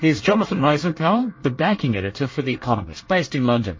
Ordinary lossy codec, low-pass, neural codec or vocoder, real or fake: MP3, 32 kbps; 7.2 kHz; codec, 24 kHz, 1 kbps, SNAC; fake